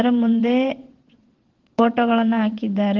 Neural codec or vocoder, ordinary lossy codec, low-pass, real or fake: vocoder, 22.05 kHz, 80 mel bands, WaveNeXt; Opus, 16 kbps; 7.2 kHz; fake